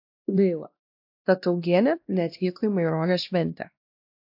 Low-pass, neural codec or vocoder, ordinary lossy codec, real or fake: 5.4 kHz; codec, 16 kHz, 1 kbps, X-Codec, WavLM features, trained on Multilingual LibriSpeech; AAC, 48 kbps; fake